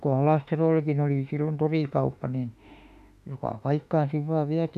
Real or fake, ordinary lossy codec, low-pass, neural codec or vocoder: fake; none; 14.4 kHz; autoencoder, 48 kHz, 32 numbers a frame, DAC-VAE, trained on Japanese speech